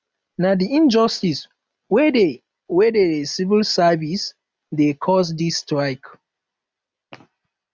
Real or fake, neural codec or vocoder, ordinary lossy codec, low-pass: real; none; none; none